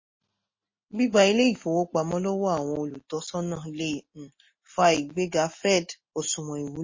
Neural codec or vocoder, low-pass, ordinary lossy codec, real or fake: none; 7.2 kHz; MP3, 32 kbps; real